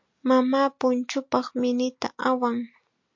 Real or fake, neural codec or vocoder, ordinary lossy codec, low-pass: real; none; MP3, 48 kbps; 7.2 kHz